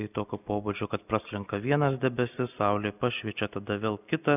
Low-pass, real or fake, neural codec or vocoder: 3.6 kHz; real; none